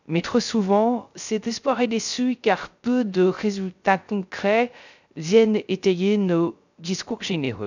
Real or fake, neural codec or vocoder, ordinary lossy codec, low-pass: fake; codec, 16 kHz, 0.3 kbps, FocalCodec; none; 7.2 kHz